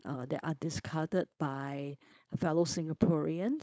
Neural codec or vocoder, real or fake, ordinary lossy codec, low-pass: codec, 16 kHz, 4.8 kbps, FACodec; fake; none; none